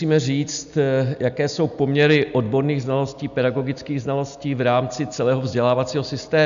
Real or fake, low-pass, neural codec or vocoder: real; 7.2 kHz; none